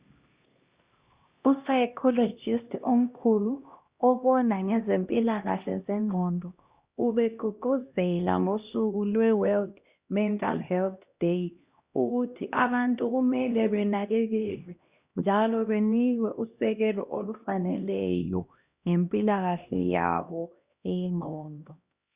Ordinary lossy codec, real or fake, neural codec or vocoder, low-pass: Opus, 64 kbps; fake; codec, 16 kHz, 1 kbps, X-Codec, HuBERT features, trained on LibriSpeech; 3.6 kHz